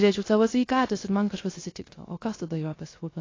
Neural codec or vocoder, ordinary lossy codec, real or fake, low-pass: codec, 16 kHz, 0.3 kbps, FocalCodec; AAC, 32 kbps; fake; 7.2 kHz